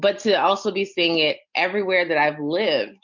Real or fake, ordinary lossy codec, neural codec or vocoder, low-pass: real; MP3, 48 kbps; none; 7.2 kHz